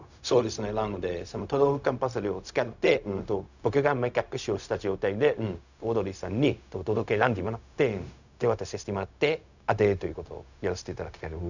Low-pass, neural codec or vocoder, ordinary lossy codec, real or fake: 7.2 kHz; codec, 16 kHz, 0.4 kbps, LongCat-Audio-Codec; none; fake